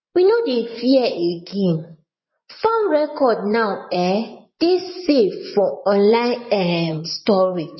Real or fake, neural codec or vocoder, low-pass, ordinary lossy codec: fake; vocoder, 24 kHz, 100 mel bands, Vocos; 7.2 kHz; MP3, 24 kbps